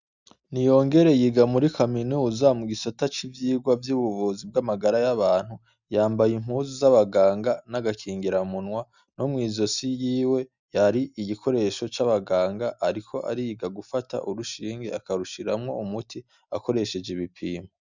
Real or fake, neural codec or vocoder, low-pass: real; none; 7.2 kHz